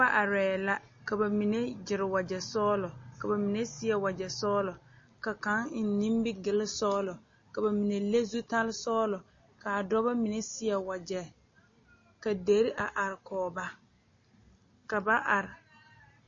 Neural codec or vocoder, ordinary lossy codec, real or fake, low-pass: none; MP3, 32 kbps; real; 7.2 kHz